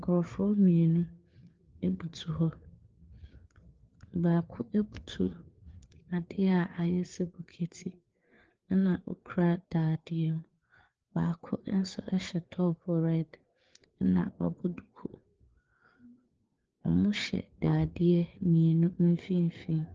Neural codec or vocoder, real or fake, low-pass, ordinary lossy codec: codec, 16 kHz, 4 kbps, FreqCodec, larger model; fake; 7.2 kHz; Opus, 16 kbps